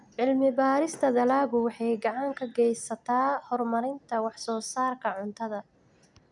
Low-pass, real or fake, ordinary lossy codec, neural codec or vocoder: 10.8 kHz; real; none; none